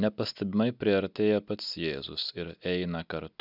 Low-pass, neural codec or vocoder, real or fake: 5.4 kHz; none; real